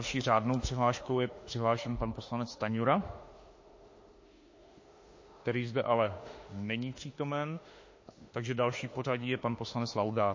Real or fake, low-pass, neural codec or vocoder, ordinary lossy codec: fake; 7.2 kHz; autoencoder, 48 kHz, 32 numbers a frame, DAC-VAE, trained on Japanese speech; MP3, 32 kbps